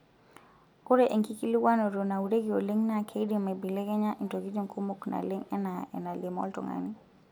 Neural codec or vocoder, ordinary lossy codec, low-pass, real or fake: none; none; 19.8 kHz; real